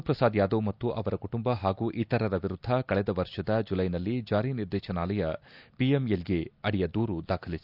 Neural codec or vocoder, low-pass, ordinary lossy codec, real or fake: none; 5.4 kHz; none; real